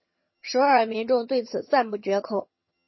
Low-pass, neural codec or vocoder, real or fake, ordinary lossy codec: 7.2 kHz; vocoder, 22.05 kHz, 80 mel bands, HiFi-GAN; fake; MP3, 24 kbps